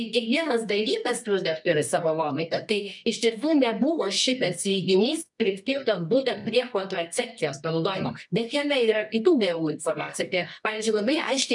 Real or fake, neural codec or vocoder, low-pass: fake; codec, 24 kHz, 0.9 kbps, WavTokenizer, medium music audio release; 10.8 kHz